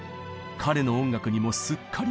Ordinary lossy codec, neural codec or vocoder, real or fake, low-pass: none; none; real; none